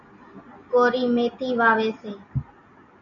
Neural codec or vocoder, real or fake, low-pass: none; real; 7.2 kHz